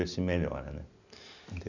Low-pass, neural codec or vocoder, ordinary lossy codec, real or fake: 7.2 kHz; none; none; real